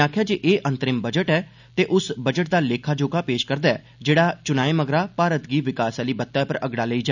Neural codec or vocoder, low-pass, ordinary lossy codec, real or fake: none; 7.2 kHz; none; real